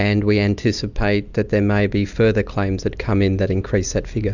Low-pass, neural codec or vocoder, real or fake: 7.2 kHz; none; real